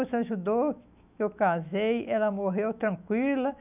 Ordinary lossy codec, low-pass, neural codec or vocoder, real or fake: none; 3.6 kHz; autoencoder, 48 kHz, 128 numbers a frame, DAC-VAE, trained on Japanese speech; fake